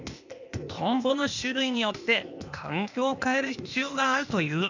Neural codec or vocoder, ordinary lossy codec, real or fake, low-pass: codec, 16 kHz, 0.8 kbps, ZipCodec; none; fake; 7.2 kHz